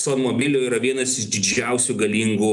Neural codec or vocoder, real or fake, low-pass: none; real; 10.8 kHz